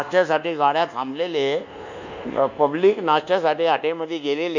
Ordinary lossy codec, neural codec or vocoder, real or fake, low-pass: none; codec, 24 kHz, 1.2 kbps, DualCodec; fake; 7.2 kHz